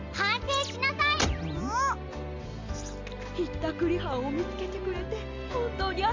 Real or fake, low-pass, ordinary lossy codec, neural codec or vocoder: real; 7.2 kHz; MP3, 64 kbps; none